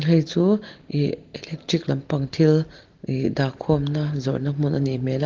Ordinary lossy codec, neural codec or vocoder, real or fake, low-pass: Opus, 16 kbps; none; real; 7.2 kHz